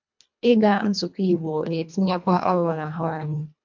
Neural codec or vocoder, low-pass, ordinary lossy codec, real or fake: codec, 24 kHz, 1.5 kbps, HILCodec; 7.2 kHz; MP3, 64 kbps; fake